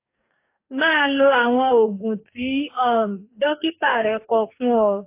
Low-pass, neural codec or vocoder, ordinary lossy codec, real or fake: 3.6 kHz; codec, 44.1 kHz, 2.6 kbps, DAC; AAC, 24 kbps; fake